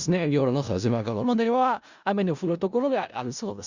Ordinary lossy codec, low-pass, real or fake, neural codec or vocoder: Opus, 64 kbps; 7.2 kHz; fake; codec, 16 kHz in and 24 kHz out, 0.4 kbps, LongCat-Audio-Codec, four codebook decoder